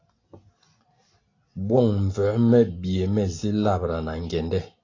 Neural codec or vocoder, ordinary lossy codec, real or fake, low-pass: none; AAC, 32 kbps; real; 7.2 kHz